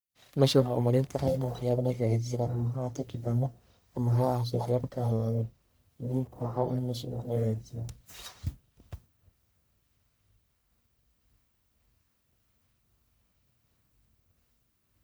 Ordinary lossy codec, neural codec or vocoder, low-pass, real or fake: none; codec, 44.1 kHz, 1.7 kbps, Pupu-Codec; none; fake